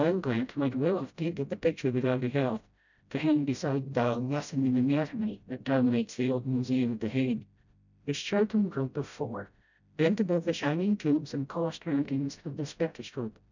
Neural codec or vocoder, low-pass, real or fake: codec, 16 kHz, 0.5 kbps, FreqCodec, smaller model; 7.2 kHz; fake